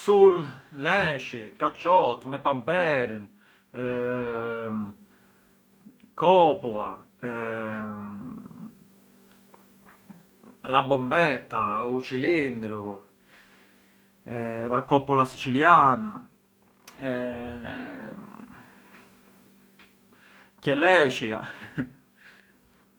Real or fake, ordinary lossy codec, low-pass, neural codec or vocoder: fake; none; none; codec, 44.1 kHz, 2.6 kbps, DAC